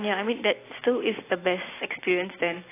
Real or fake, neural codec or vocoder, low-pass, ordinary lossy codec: real; none; 3.6 kHz; AAC, 16 kbps